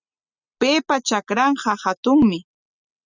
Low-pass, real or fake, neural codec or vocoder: 7.2 kHz; real; none